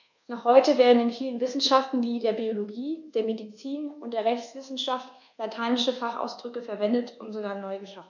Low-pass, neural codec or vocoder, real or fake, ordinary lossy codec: 7.2 kHz; codec, 24 kHz, 1.2 kbps, DualCodec; fake; none